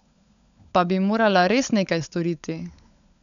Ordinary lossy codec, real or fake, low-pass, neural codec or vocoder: none; fake; 7.2 kHz; codec, 16 kHz, 16 kbps, FunCodec, trained on LibriTTS, 50 frames a second